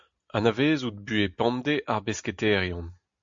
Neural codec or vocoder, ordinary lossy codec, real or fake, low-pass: none; MP3, 64 kbps; real; 7.2 kHz